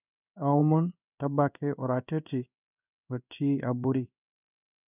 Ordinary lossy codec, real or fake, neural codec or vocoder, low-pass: none; fake; codec, 16 kHz in and 24 kHz out, 1 kbps, XY-Tokenizer; 3.6 kHz